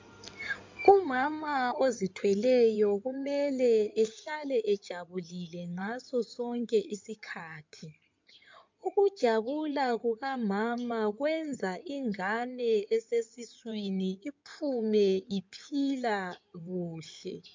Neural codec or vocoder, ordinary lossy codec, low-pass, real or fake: codec, 16 kHz in and 24 kHz out, 2.2 kbps, FireRedTTS-2 codec; MP3, 64 kbps; 7.2 kHz; fake